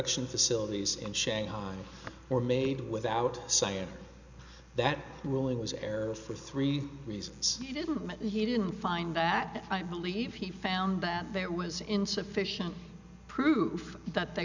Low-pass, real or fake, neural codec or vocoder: 7.2 kHz; real; none